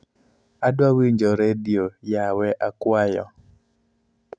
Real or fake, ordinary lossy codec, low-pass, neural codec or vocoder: real; none; 9.9 kHz; none